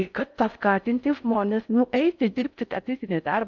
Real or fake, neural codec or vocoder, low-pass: fake; codec, 16 kHz in and 24 kHz out, 0.6 kbps, FocalCodec, streaming, 4096 codes; 7.2 kHz